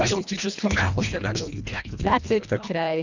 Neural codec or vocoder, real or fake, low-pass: codec, 24 kHz, 1.5 kbps, HILCodec; fake; 7.2 kHz